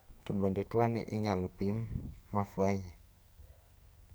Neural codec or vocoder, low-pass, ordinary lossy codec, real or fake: codec, 44.1 kHz, 2.6 kbps, SNAC; none; none; fake